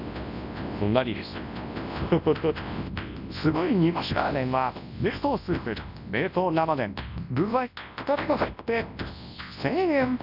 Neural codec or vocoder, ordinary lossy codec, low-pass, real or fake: codec, 24 kHz, 0.9 kbps, WavTokenizer, large speech release; none; 5.4 kHz; fake